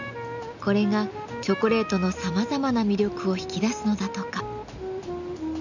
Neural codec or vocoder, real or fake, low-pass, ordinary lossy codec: none; real; 7.2 kHz; none